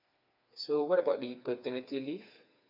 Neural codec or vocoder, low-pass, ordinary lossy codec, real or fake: codec, 16 kHz, 4 kbps, FreqCodec, smaller model; 5.4 kHz; none; fake